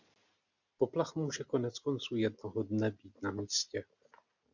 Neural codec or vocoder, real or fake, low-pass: none; real; 7.2 kHz